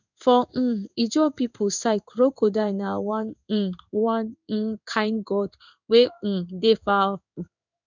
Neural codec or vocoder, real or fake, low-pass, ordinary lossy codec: codec, 16 kHz in and 24 kHz out, 1 kbps, XY-Tokenizer; fake; 7.2 kHz; none